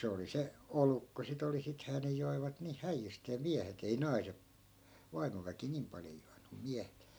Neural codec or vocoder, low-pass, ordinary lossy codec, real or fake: none; none; none; real